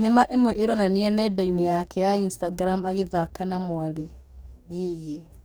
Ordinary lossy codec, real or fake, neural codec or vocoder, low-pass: none; fake; codec, 44.1 kHz, 2.6 kbps, DAC; none